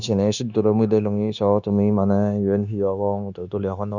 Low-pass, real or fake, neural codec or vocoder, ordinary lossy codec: 7.2 kHz; fake; codec, 16 kHz, 0.9 kbps, LongCat-Audio-Codec; none